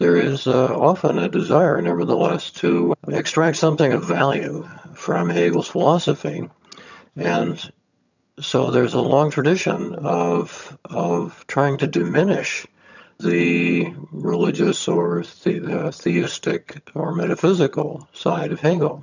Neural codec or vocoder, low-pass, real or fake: vocoder, 22.05 kHz, 80 mel bands, HiFi-GAN; 7.2 kHz; fake